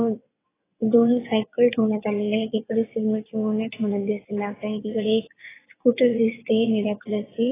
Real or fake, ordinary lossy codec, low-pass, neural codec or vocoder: fake; AAC, 16 kbps; 3.6 kHz; vocoder, 22.05 kHz, 80 mel bands, WaveNeXt